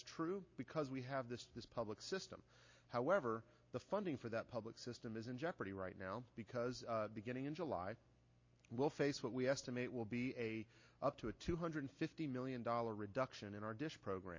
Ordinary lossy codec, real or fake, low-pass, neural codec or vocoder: MP3, 32 kbps; real; 7.2 kHz; none